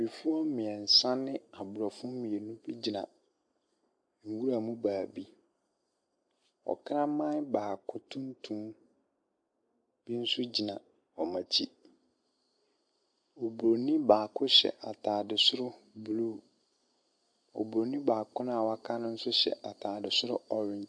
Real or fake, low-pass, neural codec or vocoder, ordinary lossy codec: real; 9.9 kHz; none; MP3, 64 kbps